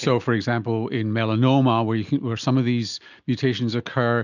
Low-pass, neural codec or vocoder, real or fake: 7.2 kHz; none; real